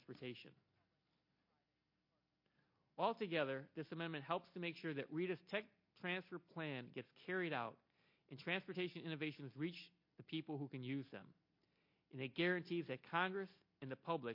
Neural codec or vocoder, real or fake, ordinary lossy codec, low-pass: none; real; MP3, 32 kbps; 5.4 kHz